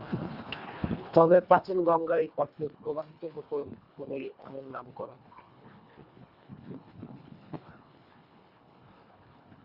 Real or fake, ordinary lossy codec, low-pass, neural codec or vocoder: fake; Opus, 64 kbps; 5.4 kHz; codec, 24 kHz, 1.5 kbps, HILCodec